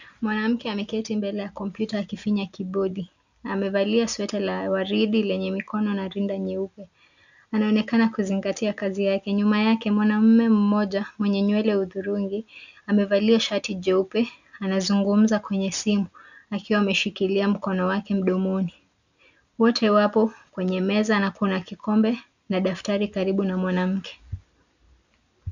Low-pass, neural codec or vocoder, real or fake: 7.2 kHz; none; real